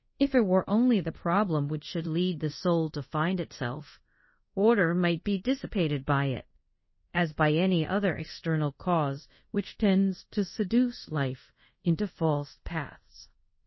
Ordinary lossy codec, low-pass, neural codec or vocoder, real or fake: MP3, 24 kbps; 7.2 kHz; codec, 24 kHz, 0.5 kbps, DualCodec; fake